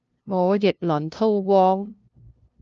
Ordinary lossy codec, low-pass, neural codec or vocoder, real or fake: Opus, 24 kbps; 7.2 kHz; codec, 16 kHz, 0.5 kbps, FunCodec, trained on LibriTTS, 25 frames a second; fake